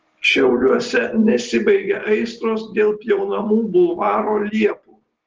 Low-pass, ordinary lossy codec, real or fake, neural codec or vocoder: 7.2 kHz; Opus, 16 kbps; real; none